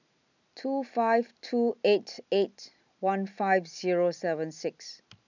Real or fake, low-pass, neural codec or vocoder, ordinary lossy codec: real; 7.2 kHz; none; none